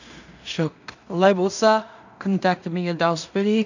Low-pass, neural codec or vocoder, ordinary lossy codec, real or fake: 7.2 kHz; codec, 16 kHz in and 24 kHz out, 0.4 kbps, LongCat-Audio-Codec, two codebook decoder; none; fake